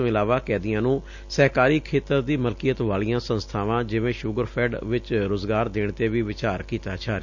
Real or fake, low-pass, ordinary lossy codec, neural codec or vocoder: real; 7.2 kHz; none; none